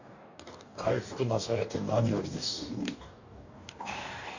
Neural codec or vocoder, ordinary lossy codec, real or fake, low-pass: codec, 44.1 kHz, 2.6 kbps, DAC; none; fake; 7.2 kHz